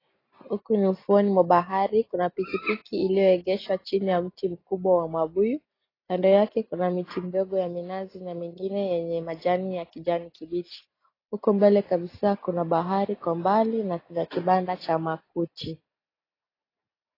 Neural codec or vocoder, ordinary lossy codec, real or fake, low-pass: none; AAC, 24 kbps; real; 5.4 kHz